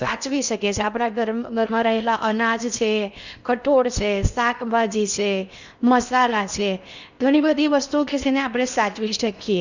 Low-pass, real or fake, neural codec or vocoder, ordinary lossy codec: 7.2 kHz; fake; codec, 16 kHz in and 24 kHz out, 0.8 kbps, FocalCodec, streaming, 65536 codes; Opus, 64 kbps